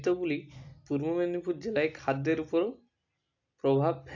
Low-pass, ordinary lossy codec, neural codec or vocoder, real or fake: 7.2 kHz; AAC, 48 kbps; none; real